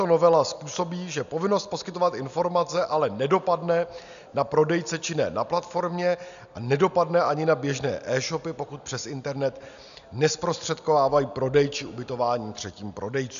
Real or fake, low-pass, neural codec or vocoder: real; 7.2 kHz; none